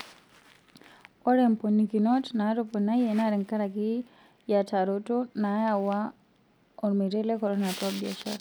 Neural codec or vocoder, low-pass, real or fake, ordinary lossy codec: none; none; real; none